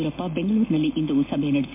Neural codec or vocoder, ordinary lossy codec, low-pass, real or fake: none; MP3, 24 kbps; 3.6 kHz; real